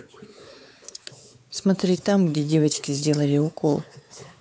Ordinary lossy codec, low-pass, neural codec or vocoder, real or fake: none; none; codec, 16 kHz, 4 kbps, X-Codec, WavLM features, trained on Multilingual LibriSpeech; fake